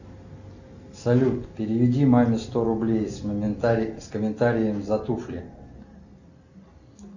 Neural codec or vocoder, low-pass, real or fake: none; 7.2 kHz; real